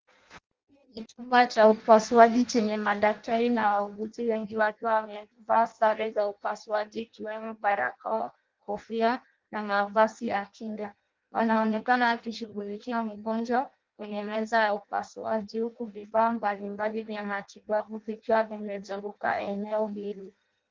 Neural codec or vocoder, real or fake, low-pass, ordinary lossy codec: codec, 16 kHz in and 24 kHz out, 0.6 kbps, FireRedTTS-2 codec; fake; 7.2 kHz; Opus, 24 kbps